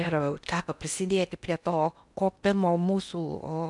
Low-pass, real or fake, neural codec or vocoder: 10.8 kHz; fake; codec, 16 kHz in and 24 kHz out, 0.6 kbps, FocalCodec, streaming, 2048 codes